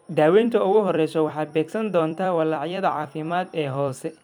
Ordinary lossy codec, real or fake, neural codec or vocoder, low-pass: none; fake; vocoder, 44.1 kHz, 128 mel bands every 256 samples, BigVGAN v2; 19.8 kHz